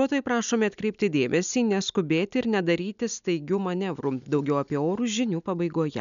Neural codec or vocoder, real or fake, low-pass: none; real; 7.2 kHz